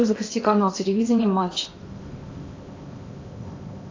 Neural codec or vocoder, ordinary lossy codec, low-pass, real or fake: codec, 16 kHz in and 24 kHz out, 0.8 kbps, FocalCodec, streaming, 65536 codes; AAC, 32 kbps; 7.2 kHz; fake